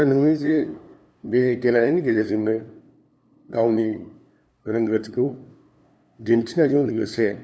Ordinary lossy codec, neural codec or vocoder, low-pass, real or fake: none; codec, 16 kHz, 2 kbps, FunCodec, trained on LibriTTS, 25 frames a second; none; fake